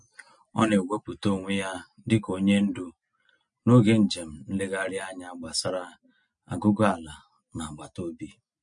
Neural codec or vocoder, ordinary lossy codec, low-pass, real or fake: none; MP3, 48 kbps; 9.9 kHz; real